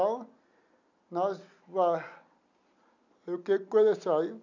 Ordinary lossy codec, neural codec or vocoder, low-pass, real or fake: none; none; 7.2 kHz; real